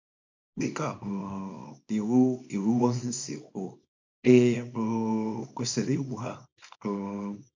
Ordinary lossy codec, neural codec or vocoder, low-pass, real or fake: MP3, 64 kbps; codec, 24 kHz, 0.9 kbps, WavTokenizer, small release; 7.2 kHz; fake